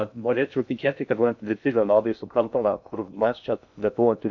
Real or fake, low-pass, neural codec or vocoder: fake; 7.2 kHz; codec, 16 kHz in and 24 kHz out, 0.6 kbps, FocalCodec, streaming, 2048 codes